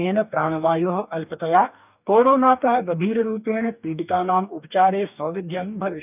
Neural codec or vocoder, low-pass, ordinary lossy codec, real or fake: codec, 44.1 kHz, 2.6 kbps, DAC; 3.6 kHz; none; fake